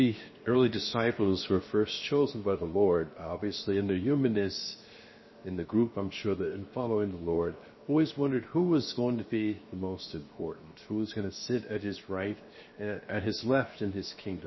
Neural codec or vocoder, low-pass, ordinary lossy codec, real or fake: codec, 16 kHz, 0.7 kbps, FocalCodec; 7.2 kHz; MP3, 24 kbps; fake